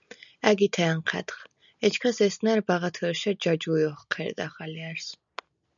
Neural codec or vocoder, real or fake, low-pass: none; real; 7.2 kHz